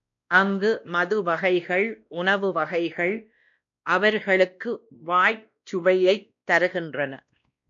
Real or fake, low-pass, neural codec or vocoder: fake; 7.2 kHz; codec, 16 kHz, 1 kbps, X-Codec, WavLM features, trained on Multilingual LibriSpeech